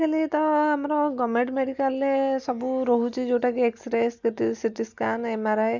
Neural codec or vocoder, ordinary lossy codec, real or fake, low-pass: none; none; real; 7.2 kHz